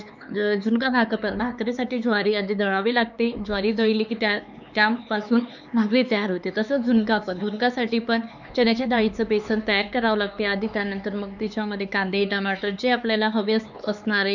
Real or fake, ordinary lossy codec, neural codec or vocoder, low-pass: fake; none; codec, 16 kHz, 4 kbps, X-Codec, HuBERT features, trained on LibriSpeech; 7.2 kHz